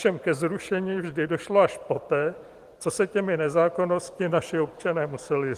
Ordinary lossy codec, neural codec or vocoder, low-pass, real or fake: Opus, 24 kbps; vocoder, 44.1 kHz, 128 mel bands every 512 samples, BigVGAN v2; 14.4 kHz; fake